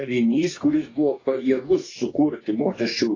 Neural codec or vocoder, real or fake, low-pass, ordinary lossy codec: codec, 44.1 kHz, 2.6 kbps, DAC; fake; 7.2 kHz; AAC, 32 kbps